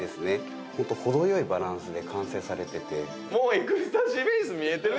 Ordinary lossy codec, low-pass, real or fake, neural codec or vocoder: none; none; real; none